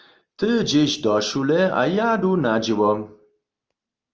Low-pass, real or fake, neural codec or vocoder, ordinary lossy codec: 7.2 kHz; real; none; Opus, 24 kbps